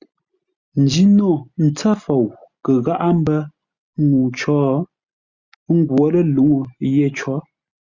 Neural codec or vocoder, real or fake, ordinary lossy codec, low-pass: none; real; Opus, 64 kbps; 7.2 kHz